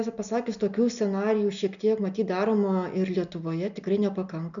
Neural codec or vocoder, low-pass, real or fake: none; 7.2 kHz; real